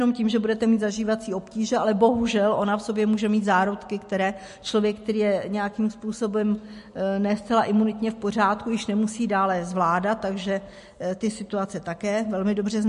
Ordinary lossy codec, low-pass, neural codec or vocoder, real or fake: MP3, 48 kbps; 14.4 kHz; none; real